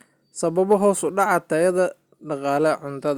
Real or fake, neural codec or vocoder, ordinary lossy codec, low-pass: real; none; Opus, 64 kbps; 19.8 kHz